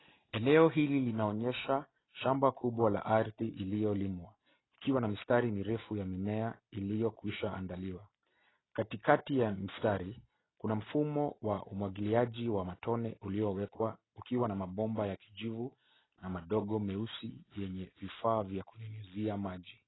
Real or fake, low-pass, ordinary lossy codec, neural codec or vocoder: real; 7.2 kHz; AAC, 16 kbps; none